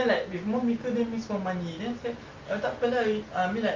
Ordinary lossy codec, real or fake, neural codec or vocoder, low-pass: Opus, 32 kbps; real; none; 7.2 kHz